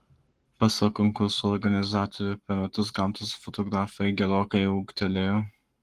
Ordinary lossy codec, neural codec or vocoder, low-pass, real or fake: Opus, 24 kbps; codec, 44.1 kHz, 7.8 kbps, Pupu-Codec; 14.4 kHz; fake